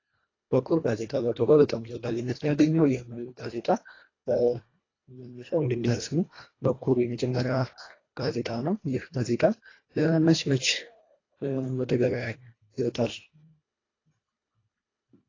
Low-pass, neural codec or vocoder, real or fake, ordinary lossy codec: 7.2 kHz; codec, 24 kHz, 1.5 kbps, HILCodec; fake; AAC, 32 kbps